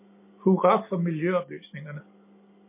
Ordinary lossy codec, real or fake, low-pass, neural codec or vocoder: MP3, 24 kbps; real; 3.6 kHz; none